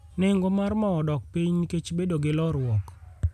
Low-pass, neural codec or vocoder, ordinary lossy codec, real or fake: 14.4 kHz; none; none; real